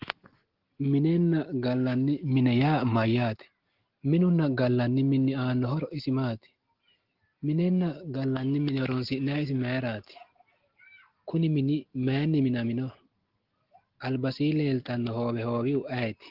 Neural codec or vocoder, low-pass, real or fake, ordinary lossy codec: none; 5.4 kHz; real; Opus, 16 kbps